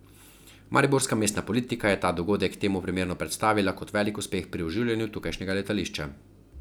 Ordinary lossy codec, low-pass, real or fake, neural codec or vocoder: none; none; real; none